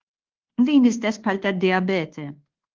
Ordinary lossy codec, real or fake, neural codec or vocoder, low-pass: Opus, 16 kbps; fake; codec, 24 kHz, 1.2 kbps, DualCodec; 7.2 kHz